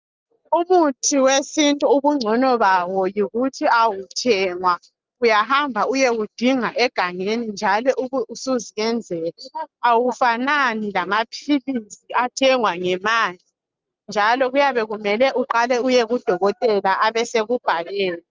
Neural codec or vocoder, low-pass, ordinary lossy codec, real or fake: none; 7.2 kHz; Opus, 32 kbps; real